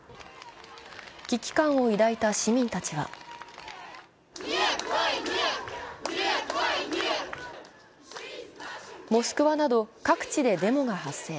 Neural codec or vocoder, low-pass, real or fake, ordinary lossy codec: none; none; real; none